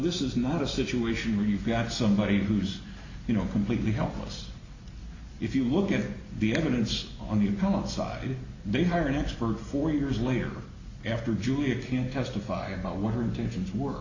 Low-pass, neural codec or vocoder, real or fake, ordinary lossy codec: 7.2 kHz; none; real; Opus, 64 kbps